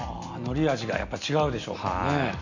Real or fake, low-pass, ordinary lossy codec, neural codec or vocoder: real; 7.2 kHz; none; none